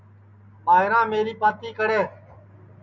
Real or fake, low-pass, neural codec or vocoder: real; 7.2 kHz; none